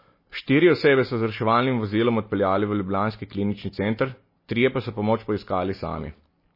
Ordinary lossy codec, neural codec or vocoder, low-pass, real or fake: MP3, 24 kbps; none; 5.4 kHz; real